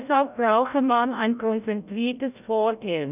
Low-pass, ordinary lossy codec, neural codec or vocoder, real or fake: 3.6 kHz; none; codec, 16 kHz, 0.5 kbps, FreqCodec, larger model; fake